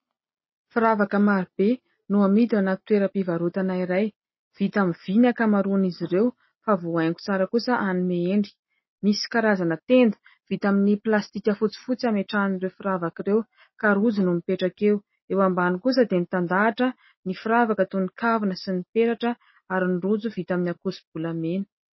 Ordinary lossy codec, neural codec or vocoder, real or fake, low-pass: MP3, 24 kbps; none; real; 7.2 kHz